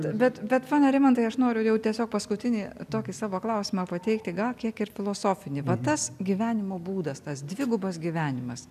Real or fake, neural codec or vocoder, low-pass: real; none; 14.4 kHz